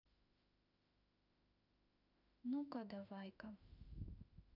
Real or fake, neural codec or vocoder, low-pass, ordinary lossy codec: fake; autoencoder, 48 kHz, 32 numbers a frame, DAC-VAE, trained on Japanese speech; 5.4 kHz; none